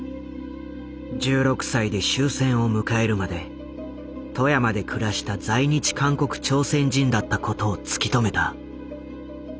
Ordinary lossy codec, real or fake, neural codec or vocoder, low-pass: none; real; none; none